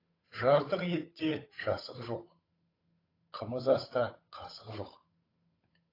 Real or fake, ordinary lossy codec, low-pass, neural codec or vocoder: fake; AAC, 24 kbps; 5.4 kHz; codec, 16 kHz, 8 kbps, FunCodec, trained on Chinese and English, 25 frames a second